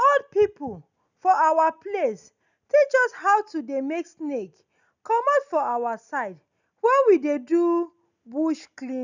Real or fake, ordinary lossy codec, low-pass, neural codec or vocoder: real; none; 7.2 kHz; none